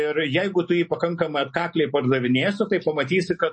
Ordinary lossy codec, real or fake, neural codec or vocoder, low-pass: MP3, 32 kbps; real; none; 9.9 kHz